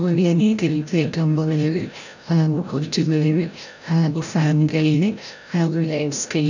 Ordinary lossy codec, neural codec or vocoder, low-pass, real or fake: none; codec, 16 kHz, 0.5 kbps, FreqCodec, larger model; 7.2 kHz; fake